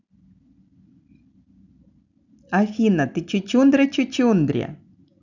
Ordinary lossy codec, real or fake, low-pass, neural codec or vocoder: none; real; 7.2 kHz; none